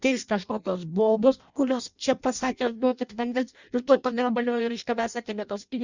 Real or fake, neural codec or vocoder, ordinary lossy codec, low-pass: fake; codec, 16 kHz in and 24 kHz out, 0.6 kbps, FireRedTTS-2 codec; Opus, 64 kbps; 7.2 kHz